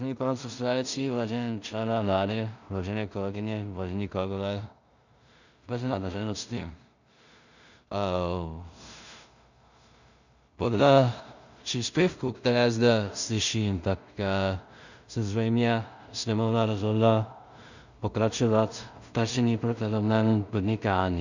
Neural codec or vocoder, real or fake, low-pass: codec, 16 kHz in and 24 kHz out, 0.4 kbps, LongCat-Audio-Codec, two codebook decoder; fake; 7.2 kHz